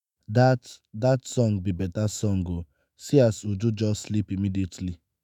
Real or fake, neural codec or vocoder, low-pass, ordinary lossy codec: fake; autoencoder, 48 kHz, 128 numbers a frame, DAC-VAE, trained on Japanese speech; 19.8 kHz; none